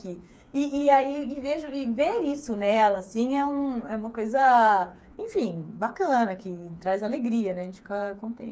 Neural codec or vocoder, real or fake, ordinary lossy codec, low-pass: codec, 16 kHz, 4 kbps, FreqCodec, smaller model; fake; none; none